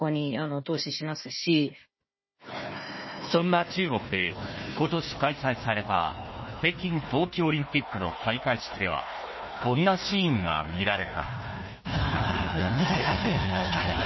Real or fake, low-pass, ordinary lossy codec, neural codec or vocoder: fake; 7.2 kHz; MP3, 24 kbps; codec, 16 kHz, 1 kbps, FunCodec, trained on Chinese and English, 50 frames a second